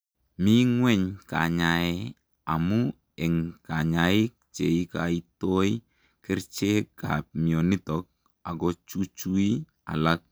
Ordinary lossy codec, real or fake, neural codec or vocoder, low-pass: none; real; none; none